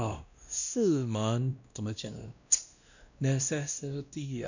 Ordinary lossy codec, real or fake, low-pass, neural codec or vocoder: none; fake; 7.2 kHz; codec, 16 kHz, 1 kbps, X-Codec, WavLM features, trained on Multilingual LibriSpeech